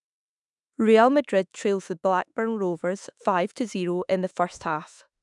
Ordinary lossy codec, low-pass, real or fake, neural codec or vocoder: none; 10.8 kHz; fake; autoencoder, 48 kHz, 32 numbers a frame, DAC-VAE, trained on Japanese speech